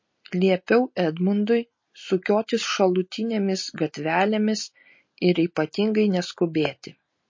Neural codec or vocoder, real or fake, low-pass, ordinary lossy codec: none; real; 7.2 kHz; MP3, 32 kbps